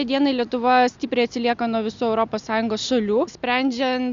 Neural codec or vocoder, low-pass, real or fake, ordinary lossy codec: none; 7.2 kHz; real; Opus, 64 kbps